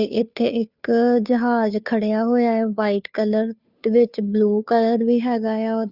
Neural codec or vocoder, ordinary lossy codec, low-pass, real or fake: codec, 16 kHz, 2 kbps, FunCodec, trained on Chinese and English, 25 frames a second; Opus, 64 kbps; 5.4 kHz; fake